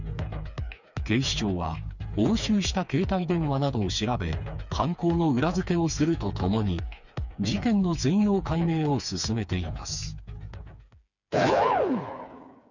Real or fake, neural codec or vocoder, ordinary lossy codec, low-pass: fake; codec, 16 kHz, 4 kbps, FreqCodec, smaller model; none; 7.2 kHz